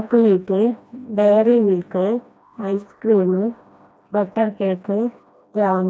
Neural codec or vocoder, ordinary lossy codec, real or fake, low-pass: codec, 16 kHz, 1 kbps, FreqCodec, smaller model; none; fake; none